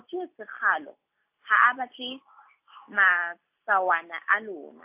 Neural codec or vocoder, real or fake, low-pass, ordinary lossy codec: none; real; 3.6 kHz; AAC, 32 kbps